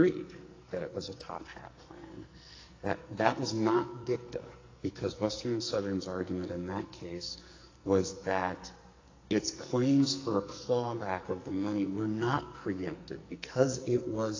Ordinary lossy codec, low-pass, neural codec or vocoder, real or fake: AAC, 32 kbps; 7.2 kHz; codec, 44.1 kHz, 2.6 kbps, SNAC; fake